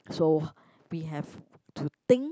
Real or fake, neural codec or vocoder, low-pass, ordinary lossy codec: real; none; none; none